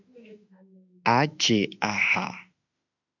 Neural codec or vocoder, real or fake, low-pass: autoencoder, 48 kHz, 32 numbers a frame, DAC-VAE, trained on Japanese speech; fake; 7.2 kHz